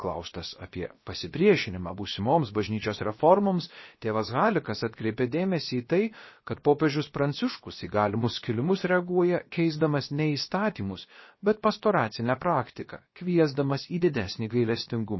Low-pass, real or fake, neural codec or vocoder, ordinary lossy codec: 7.2 kHz; fake; codec, 16 kHz, about 1 kbps, DyCAST, with the encoder's durations; MP3, 24 kbps